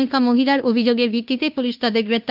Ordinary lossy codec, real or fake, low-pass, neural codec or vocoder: none; fake; 5.4 kHz; codec, 16 kHz in and 24 kHz out, 0.9 kbps, LongCat-Audio-Codec, fine tuned four codebook decoder